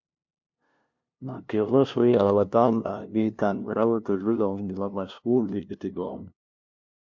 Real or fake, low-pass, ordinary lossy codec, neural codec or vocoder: fake; 7.2 kHz; MP3, 48 kbps; codec, 16 kHz, 0.5 kbps, FunCodec, trained on LibriTTS, 25 frames a second